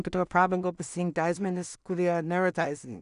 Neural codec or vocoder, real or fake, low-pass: codec, 16 kHz in and 24 kHz out, 0.4 kbps, LongCat-Audio-Codec, two codebook decoder; fake; 10.8 kHz